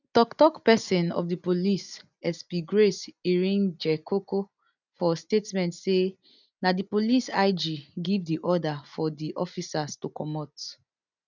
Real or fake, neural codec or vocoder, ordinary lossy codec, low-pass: real; none; none; 7.2 kHz